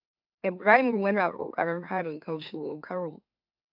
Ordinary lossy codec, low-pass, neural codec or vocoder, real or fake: AAC, 48 kbps; 5.4 kHz; autoencoder, 44.1 kHz, a latent of 192 numbers a frame, MeloTTS; fake